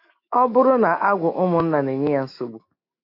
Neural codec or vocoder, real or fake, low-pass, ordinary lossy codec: autoencoder, 48 kHz, 128 numbers a frame, DAC-VAE, trained on Japanese speech; fake; 5.4 kHz; AAC, 32 kbps